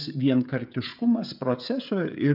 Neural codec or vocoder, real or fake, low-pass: codec, 16 kHz, 16 kbps, FreqCodec, smaller model; fake; 5.4 kHz